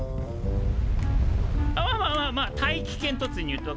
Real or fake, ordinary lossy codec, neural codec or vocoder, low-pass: real; none; none; none